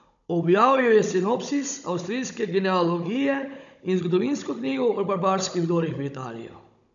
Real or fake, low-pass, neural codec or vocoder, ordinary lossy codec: fake; 7.2 kHz; codec, 16 kHz, 16 kbps, FunCodec, trained on Chinese and English, 50 frames a second; none